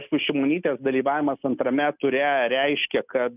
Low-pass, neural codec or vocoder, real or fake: 3.6 kHz; none; real